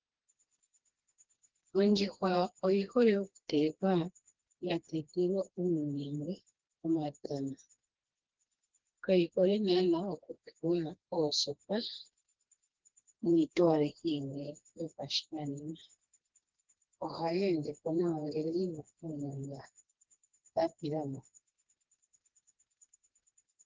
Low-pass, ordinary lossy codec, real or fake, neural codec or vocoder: 7.2 kHz; Opus, 16 kbps; fake; codec, 16 kHz, 2 kbps, FreqCodec, smaller model